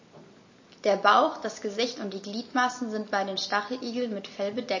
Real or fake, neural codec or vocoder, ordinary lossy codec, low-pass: real; none; MP3, 32 kbps; 7.2 kHz